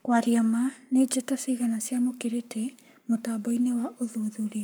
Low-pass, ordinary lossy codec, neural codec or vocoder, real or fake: none; none; codec, 44.1 kHz, 7.8 kbps, Pupu-Codec; fake